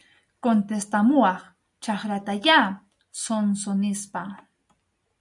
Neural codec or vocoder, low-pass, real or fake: none; 10.8 kHz; real